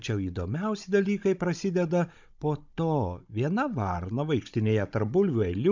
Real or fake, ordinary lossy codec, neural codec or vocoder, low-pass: fake; MP3, 64 kbps; codec, 16 kHz, 16 kbps, FunCodec, trained on Chinese and English, 50 frames a second; 7.2 kHz